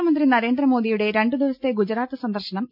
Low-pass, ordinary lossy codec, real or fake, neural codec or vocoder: 5.4 kHz; none; real; none